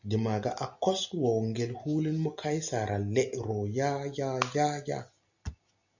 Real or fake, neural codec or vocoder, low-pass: real; none; 7.2 kHz